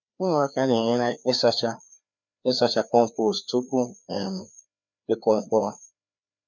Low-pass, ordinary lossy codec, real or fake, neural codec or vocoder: 7.2 kHz; none; fake; codec, 16 kHz, 2 kbps, FreqCodec, larger model